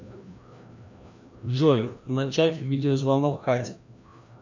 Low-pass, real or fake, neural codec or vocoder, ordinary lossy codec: 7.2 kHz; fake; codec, 16 kHz, 1 kbps, FreqCodec, larger model; MP3, 64 kbps